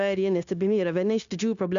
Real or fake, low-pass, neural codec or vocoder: fake; 7.2 kHz; codec, 16 kHz, 0.9 kbps, LongCat-Audio-Codec